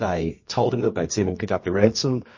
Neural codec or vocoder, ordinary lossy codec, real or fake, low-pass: codec, 24 kHz, 0.9 kbps, WavTokenizer, medium music audio release; MP3, 32 kbps; fake; 7.2 kHz